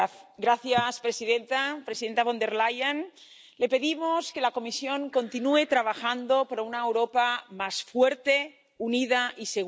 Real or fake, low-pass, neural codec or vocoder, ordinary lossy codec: real; none; none; none